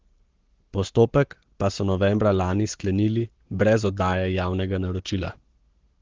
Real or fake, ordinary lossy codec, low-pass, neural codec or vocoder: fake; Opus, 16 kbps; 7.2 kHz; codec, 44.1 kHz, 7.8 kbps, Pupu-Codec